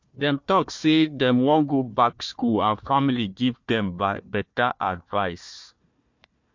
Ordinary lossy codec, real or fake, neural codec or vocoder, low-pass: MP3, 48 kbps; fake; codec, 16 kHz, 1 kbps, FunCodec, trained on Chinese and English, 50 frames a second; 7.2 kHz